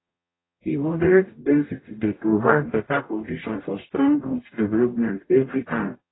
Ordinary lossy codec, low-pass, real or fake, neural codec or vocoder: AAC, 16 kbps; 7.2 kHz; fake; codec, 44.1 kHz, 0.9 kbps, DAC